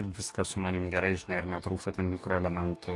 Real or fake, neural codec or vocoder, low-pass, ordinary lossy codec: fake; codec, 44.1 kHz, 2.6 kbps, DAC; 10.8 kHz; AAC, 48 kbps